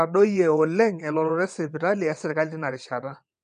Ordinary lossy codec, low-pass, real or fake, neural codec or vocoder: none; 9.9 kHz; fake; vocoder, 22.05 kHz, 80 mel bands, WaveNeXt